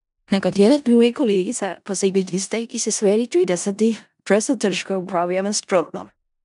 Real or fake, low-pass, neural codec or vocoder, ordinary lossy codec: fake; 10.8 kHz; codec, 16 kHz in and 24 kHz out, 0.4 kbps, LongCat-Audio-Codec, four codebook decoder; none